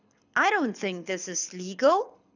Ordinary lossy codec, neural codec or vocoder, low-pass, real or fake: none; codec, 24 kHz, 6 kbps, HILCodec; 7.2 kHz; fake